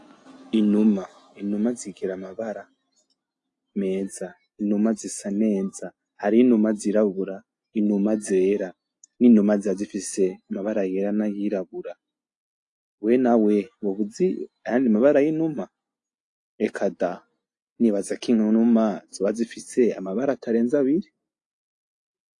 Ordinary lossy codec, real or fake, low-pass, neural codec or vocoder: AAC, 48 kbps; real; 10.8 kHz; none